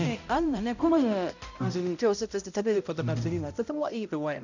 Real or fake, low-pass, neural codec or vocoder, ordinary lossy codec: fake; 7.2 kHz; codec, 16 kHz, 0.5 kbps, X-Codec, HuBERT features, trained on balanced general audio; none